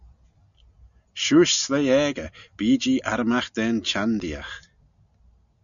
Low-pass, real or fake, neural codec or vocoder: 7.2 kHz; real; none